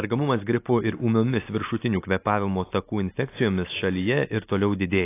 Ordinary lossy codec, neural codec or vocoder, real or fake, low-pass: AAC, 24 kbps; none; real; 3.6 kHz